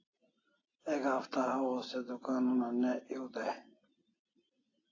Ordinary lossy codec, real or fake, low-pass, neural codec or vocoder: AAC, 32 kbps; real; 7.2 kHz; none